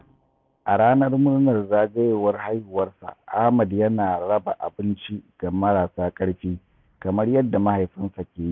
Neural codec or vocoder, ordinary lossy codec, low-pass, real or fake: none; none; none; real